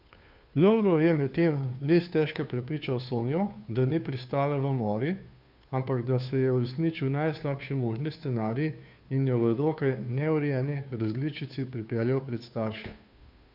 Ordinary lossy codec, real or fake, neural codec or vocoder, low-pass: none; fake; codec, 16 kHz, 2 kbps, FunCodec, trained on Chinese and English, 25 frames a second; 5.4 kHz